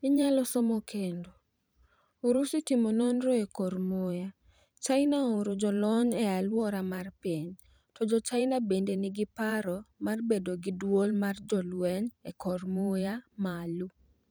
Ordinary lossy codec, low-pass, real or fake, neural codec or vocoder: none; none; fake; vocoder, 44.1 kHz, 128 mel bands every 512 samples, BigVGAN v2